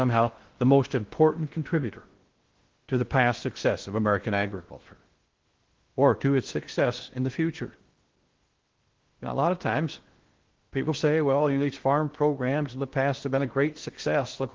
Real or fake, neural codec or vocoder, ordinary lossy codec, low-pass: fake; codec, 16 kHz in and 24 kHz out, 0.6 kbps, FocalCodec, streaming, 2048 codes; Opus, 16 kbps; 7.2 kHz